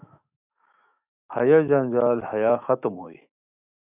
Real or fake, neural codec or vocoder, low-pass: fake; autoencoder, 48 kHz, 128 numbers a frame, DAC-VAE, trained on Japanese speech; 3.6 kHz